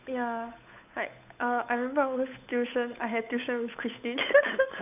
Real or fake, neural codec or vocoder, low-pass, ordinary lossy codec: fake; codec, 16 kHz, 8 kbps, FunCodec, trained on Chinese and English, 25 frames a second; 3.6 kHz; none